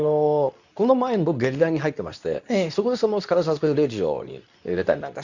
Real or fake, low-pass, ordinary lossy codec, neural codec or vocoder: fake; 7.2 kHz; none; codec, 24 kHz, 0.9 kbps, WavTokenizer, medium speech release version 2